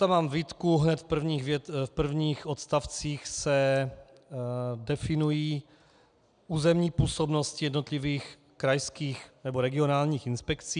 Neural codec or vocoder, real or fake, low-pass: none; real; 9.9 kHz